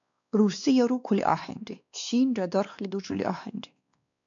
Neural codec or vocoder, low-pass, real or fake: codec, 16 kHz, 2 kbps, X-Codec, WavLM features, trained on Multilingual LibriSpeech; 7.2 kHz; fake